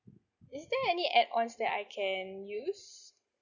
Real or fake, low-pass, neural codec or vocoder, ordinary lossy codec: real; 7.2 kHz; none; none